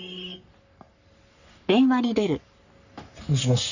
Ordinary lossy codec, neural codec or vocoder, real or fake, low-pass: none; codec, 44.1 kHz, 3.4 kbps, Pupu-Codec; fake; 7.2 kHz